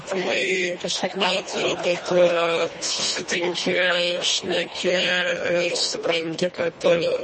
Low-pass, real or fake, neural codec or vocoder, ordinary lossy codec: 10.8 kHz; fake; codec, 24 kHz, 1.5 kbps, HILCodec; MP3, 32 kbps